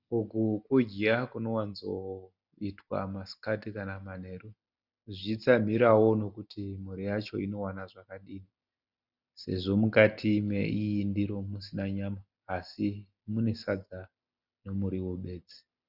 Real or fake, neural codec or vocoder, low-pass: real; none; 5.4 kHz